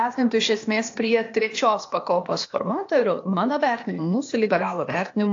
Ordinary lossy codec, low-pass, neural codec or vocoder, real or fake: AAC, 48 kbps; 7.2 kHz; codec, 16 kHz, 0.8 kbps, ZipCodec; fake